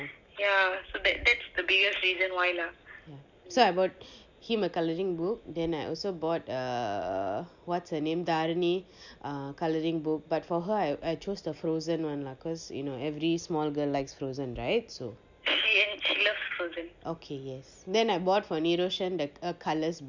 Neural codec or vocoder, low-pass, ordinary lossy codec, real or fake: none; 7.2 kHz; none; real